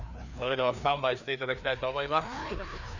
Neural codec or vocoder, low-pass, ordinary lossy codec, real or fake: codec, 16 kHz, 2 kbps, FreqCodec, larger model; 7.2 kHz; none; fake